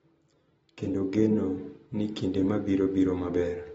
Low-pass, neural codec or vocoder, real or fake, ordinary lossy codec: 19.8 kHz; none; real; AAC, 24 kbps